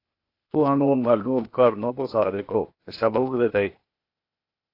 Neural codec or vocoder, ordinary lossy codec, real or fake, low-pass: codec, 16 kHz, 0.8 kbps, ZipCodec; AAC, 32 kbps; fake; 5.4 kHz